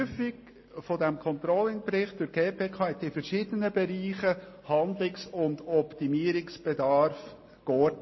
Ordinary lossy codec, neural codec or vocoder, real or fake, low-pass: MP3, 24 kbps; none; real; 7.2 kHz